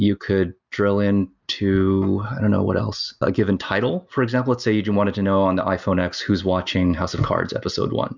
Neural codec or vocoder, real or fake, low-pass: none; real; 7.2 kHz